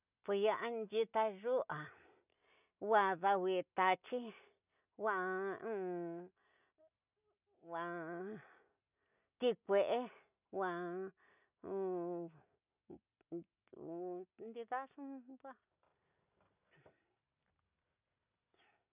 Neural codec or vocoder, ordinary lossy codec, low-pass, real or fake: none; none; 3.6 kHz; real